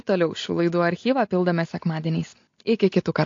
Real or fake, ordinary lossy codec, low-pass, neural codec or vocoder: real; AAC, 48 kbps; 7.2 kHz; none